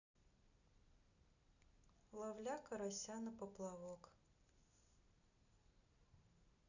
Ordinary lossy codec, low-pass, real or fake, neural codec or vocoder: none; 7.2 kHz; real; none